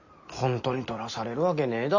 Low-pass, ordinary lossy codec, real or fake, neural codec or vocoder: 7.2 kHz; none; real; none